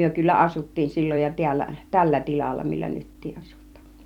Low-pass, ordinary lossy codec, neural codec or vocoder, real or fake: 19.8 kHz; none; vocoder, 44.1 kHz, 128 mel bands every 256 samples, BigVGAN v2; fake